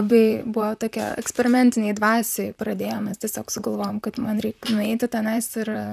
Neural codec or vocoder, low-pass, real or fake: vocoder, 44.1 kHz, 128 mel bands, Pupu-Vocoder; 14.4 kHz; fake